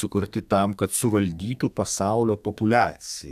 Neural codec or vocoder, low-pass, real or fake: codec, 32 kHz, 1.9 kbps, SNAC; 14.4 kHz; fake